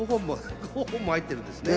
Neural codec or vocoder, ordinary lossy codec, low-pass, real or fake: none; none; none; real